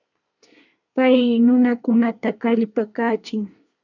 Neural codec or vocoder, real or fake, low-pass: codec, 24 kHz, 1 kbps, SNAC; fake; 7.2 kHz